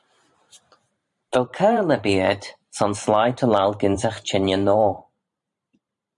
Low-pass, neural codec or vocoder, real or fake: 10.8 kHz; vocoder, 44.1 kHz, 128 mel bands every 512 samples, BigVGAN v2; fake